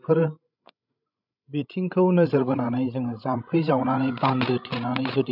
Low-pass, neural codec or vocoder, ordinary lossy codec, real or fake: 5.4 kHz; codec, 16 kHz, 16 kbps, FreqCodec, larger model; none; fake